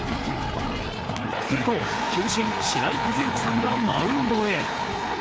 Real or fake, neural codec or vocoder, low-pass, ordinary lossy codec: fake; codec, 16 kHz, 4 kbps, FreqCodec, larger model; none; none